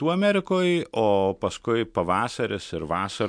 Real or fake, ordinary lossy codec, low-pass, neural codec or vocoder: real; MP3, 64 kbps; 9.9 kHz; none